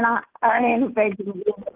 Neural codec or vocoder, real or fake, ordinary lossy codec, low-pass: none; real; Opus, 16 kbps; 3.6 kHz